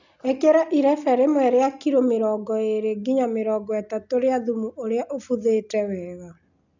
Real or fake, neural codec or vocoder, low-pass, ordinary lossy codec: real; none; 7.2 kHz; none